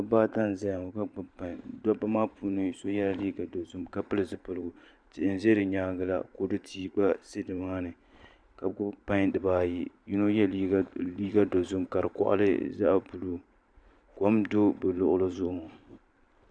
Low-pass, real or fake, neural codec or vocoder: 9.9 kHz; fake; vocoder, 44.1 kHz, 128 mel bands every 512 samples, BigVGAN v2